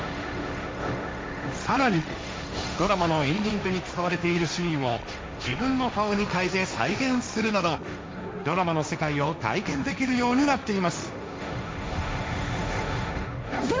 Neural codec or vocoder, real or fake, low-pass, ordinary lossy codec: codec, 16 kHz, 1.1 kbps, Voila-Tokenizer; fake; none; none